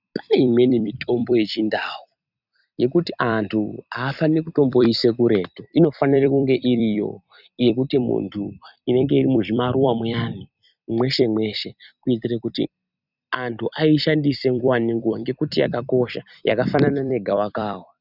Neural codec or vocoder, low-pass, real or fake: vocoder, 44.1 kHz, 128 mel bands every 256 samples, BigVGAN v2; 5.4 kHz; fake